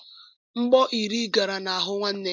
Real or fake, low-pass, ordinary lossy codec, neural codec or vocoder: real; 7.2 kHz; MP3, 64 kbps; none